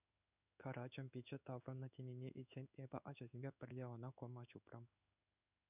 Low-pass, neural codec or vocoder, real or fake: 3.6 kHz; codec, 16 kHz in and 24 kHz out, 1 kbps, XY-Tokenizer; fake